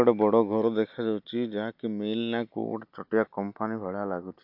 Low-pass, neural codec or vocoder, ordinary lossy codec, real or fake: 5.4 kHz; none; MP3, 48 kbps; real